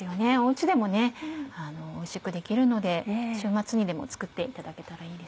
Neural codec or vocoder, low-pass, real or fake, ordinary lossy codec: none; none; real; none